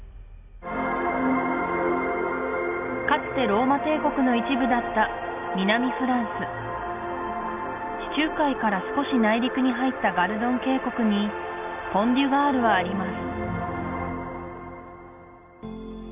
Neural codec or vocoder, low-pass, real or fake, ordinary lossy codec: none; 3.6 kHz; real; none